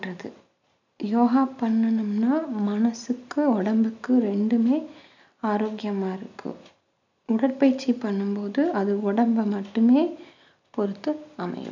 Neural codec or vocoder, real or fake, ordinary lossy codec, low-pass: none; real; none; 7.2 kHz